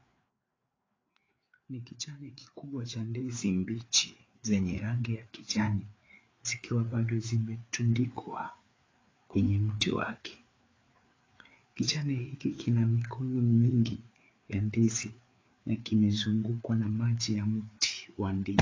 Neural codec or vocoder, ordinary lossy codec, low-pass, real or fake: codec, 16 kHz, 4 kbps, FreqCodec, larger model; AAC, 32 kbps; 7.2 kHz; fake